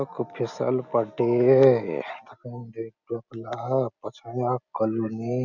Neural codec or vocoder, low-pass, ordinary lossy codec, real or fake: none; 7.2 kHz; none; real